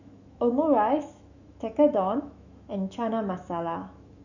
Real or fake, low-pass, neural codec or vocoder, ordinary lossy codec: fake; 7.2 kHz; autoencoder, 48 kHz, 128 numbers a frame, DAC-VAE, trained on Japanese speech; none